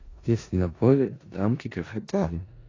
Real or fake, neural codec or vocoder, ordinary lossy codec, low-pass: fake; codec, 16 kHz in and 24 kHz out, 0.4 kbps, LongCat-Audio-Codec, four codebook decoder; AAC, 32 kbps; 7.2 kHz